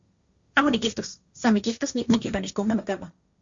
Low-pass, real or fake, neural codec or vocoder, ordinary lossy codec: 7.2 kHz; fake; codec, 16 kHz, 1.1 kbps, Voila-Tokenizer; Opus, 64 kbps